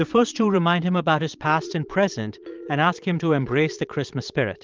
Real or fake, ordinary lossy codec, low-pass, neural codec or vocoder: fake; Opus, 32 kbps; 7.2 kHz; vocoder, 22.05 kHz, 80 mel bands, Vocos